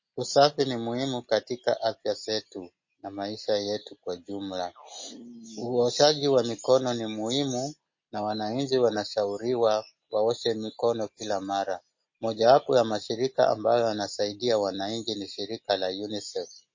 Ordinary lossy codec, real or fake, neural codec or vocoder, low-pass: MP3, 32 kbps; real; none; 7.2 kHz